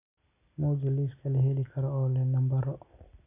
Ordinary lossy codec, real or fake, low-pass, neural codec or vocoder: none; real; 3.6 kHz; none